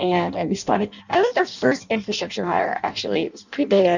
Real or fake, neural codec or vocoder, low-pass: fake; codec, 16 kHz in and 24 kHz out, 0.6 kbps, FireRedTTS-2 codec; 7.2 kHz